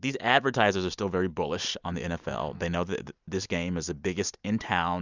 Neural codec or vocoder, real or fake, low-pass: none; real; 7.2 kHz